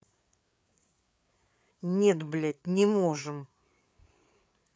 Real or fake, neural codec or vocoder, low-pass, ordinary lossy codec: fake; codec, 16 kHz, 8 kbps, FreqCodec, larger model; none; none